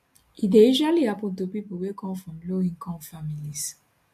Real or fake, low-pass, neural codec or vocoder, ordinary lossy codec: real; 14.4 kHz; none; AAC, 64 kbps